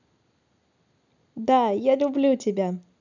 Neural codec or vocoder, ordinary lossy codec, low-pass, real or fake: none; none; 7.2 kHz; real